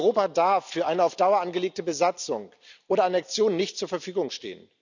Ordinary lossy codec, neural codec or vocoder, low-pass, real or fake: none; none; 7.2 kHz; real